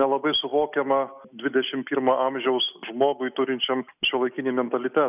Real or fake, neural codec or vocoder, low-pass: real; none; 3.6 kHz